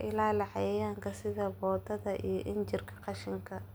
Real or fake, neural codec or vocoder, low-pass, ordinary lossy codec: real; none; none; none